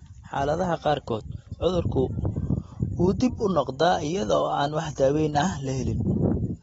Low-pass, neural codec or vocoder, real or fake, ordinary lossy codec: 19.8 kHz; none; real; AAC, 24 kbps